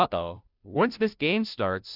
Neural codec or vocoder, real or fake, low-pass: codec, 16 kHz, 0.5 kbps, FunCodec, trained on Chinese and English, 25 frames a second; fake; 5.4 kHz